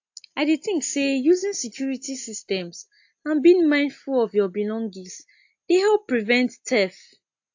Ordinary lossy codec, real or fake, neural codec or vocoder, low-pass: AAC, 48 kbps; real; none; 7.2 kHz